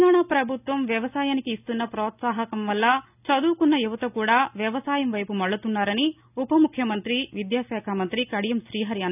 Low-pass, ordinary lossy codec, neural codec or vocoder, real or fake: 3.6 kHz; none; none; real